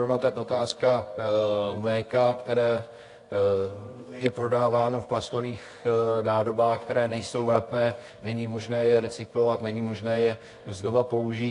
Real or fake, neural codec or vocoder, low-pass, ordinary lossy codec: fake; codec, 24 kHz, 0.9 kbps, WavTokenizer, medium music audio release; 10.8 kHz; AAC, 48 kbps